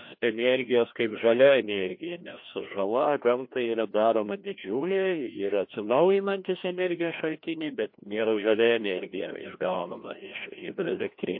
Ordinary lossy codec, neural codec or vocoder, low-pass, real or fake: MP3, 32 kbps; codec, 16 kHz, 1 kbps, FreqCodec, larger model; 5.4 kHz; fake